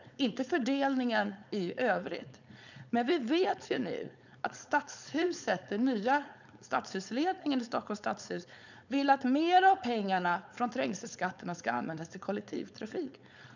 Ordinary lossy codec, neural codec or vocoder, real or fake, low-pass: none; codec, 16 kHz, 4.8 kbps, FACodec; fake; 7.2 kHz